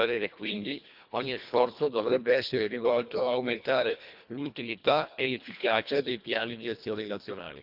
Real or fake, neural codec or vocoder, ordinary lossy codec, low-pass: fake; codec, 24 kHz, 1.5 kbps, HILCodec; Opus, 64 kbps; 5.4 kHz